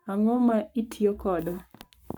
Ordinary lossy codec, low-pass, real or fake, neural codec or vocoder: none; 19.8 kHz; fake; codec, 44.1 kHz, 7.8 kbps, Pupu-Codec